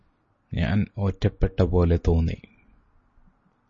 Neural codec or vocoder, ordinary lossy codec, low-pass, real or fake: none; MP3, 32 kbps; 7.2 kHz; real